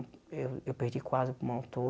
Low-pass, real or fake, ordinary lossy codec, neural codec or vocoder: none; real; none; none